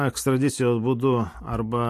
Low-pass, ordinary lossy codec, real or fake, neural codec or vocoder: 14.4 kHz; AAC, 64 kbps; real; none